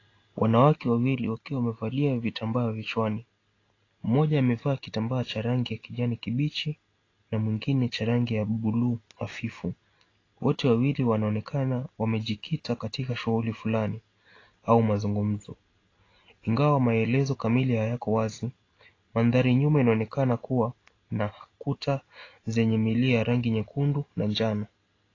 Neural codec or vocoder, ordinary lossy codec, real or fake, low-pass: none; AAC, 32 kbps; real; 7.2 kHz